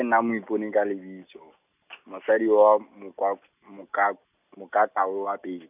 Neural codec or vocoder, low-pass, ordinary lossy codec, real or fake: none; 3.6 kHz; none; real